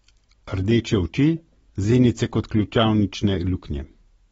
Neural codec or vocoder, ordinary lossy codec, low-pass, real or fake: none; AAC, 24 kbps; 10.8 kHz; real